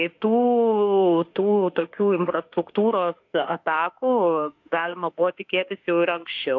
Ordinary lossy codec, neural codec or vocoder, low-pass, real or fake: AAC, 48 kbps; autoencoder, 48 kHz, 32 numbers a frame, DAC-VAE, trained on Japanese speech; 7.2 kHz; fake